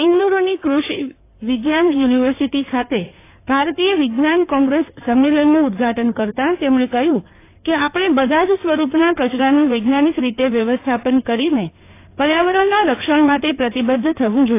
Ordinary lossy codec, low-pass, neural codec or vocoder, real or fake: AAC, 24 kbps; 3.6 kHz; codec, 16 kHz in and 24 kHz out, 2.2 kbps, FireRedTTS-2 codec; fake